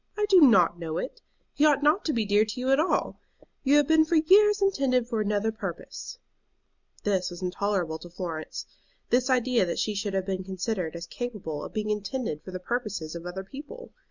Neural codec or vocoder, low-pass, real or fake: none; 7.2 kHz; real